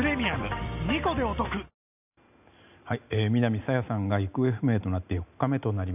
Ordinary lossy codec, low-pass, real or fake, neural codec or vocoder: none; 3.6 kHz; real; none